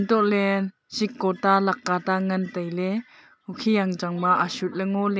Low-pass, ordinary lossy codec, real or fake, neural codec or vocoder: none; none; real; none